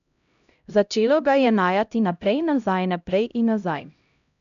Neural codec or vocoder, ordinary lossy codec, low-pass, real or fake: codec, 16 kHz, 0.5 kbps, X-Codec, HuBERT features, trained on LibriSpeech; none; 7.2 kHz; fake